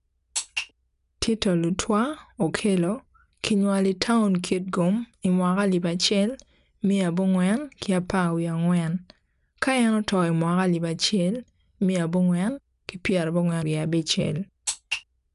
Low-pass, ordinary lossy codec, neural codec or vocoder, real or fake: 10.8 kHz; none; none; real